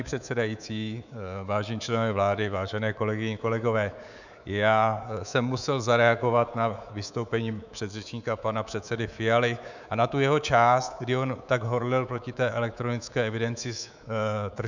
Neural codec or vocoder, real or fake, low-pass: codec, 24 kHz, 3.1 kbps, DualCodec; fake; 7.2 kHz